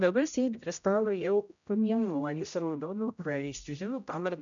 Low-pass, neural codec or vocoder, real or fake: 7.2 kHz; codec, 16 kHz, 0.5 kbps, X-Codec, HuBERT features, trained on general audio; fake